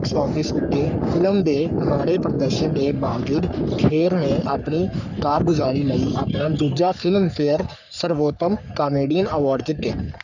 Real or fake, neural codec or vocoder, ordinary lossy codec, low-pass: fake; codec, 44.1 kHz, 3.4 kbps, Pupu-Codec; none; 7.2 kHz